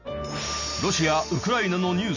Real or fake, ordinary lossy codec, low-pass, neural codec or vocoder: real; none; 7.2 kHz; none